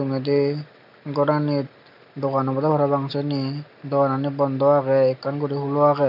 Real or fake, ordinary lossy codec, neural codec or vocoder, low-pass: real; none; none; 5.4 kHz